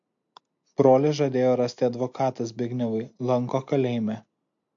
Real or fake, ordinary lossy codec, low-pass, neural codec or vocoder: real; MP3, 48 kbps; 7.2 kHz; none